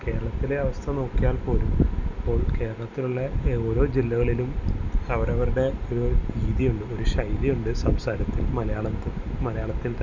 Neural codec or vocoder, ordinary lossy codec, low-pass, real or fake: none; none; 7.2 kHz; real